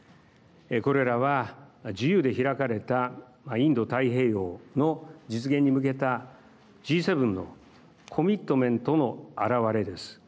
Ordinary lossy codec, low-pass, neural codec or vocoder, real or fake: none; none; none; real